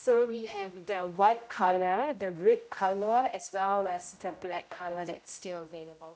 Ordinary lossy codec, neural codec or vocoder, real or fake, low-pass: none; codec, 16 kHz, 0.5 kbps, X-Codec, HuBERT features, trained on general audio; fake; none